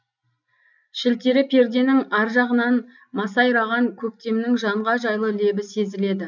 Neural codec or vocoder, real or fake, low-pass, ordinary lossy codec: none; real; 7.2 kHz; none